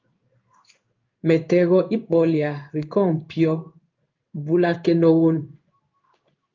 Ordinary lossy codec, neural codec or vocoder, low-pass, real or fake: Opus, 24 kbps; codec, 16 kHz in and 24 kHz out, 1 kbps, XY-Tokenizer; 7.2 kHz; fake